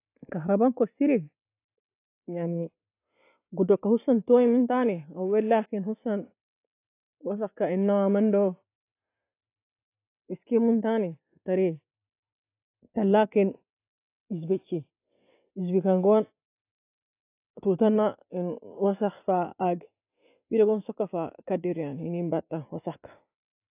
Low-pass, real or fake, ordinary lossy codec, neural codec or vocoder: 3.6 kHz; real; AAC, 24 kbps; none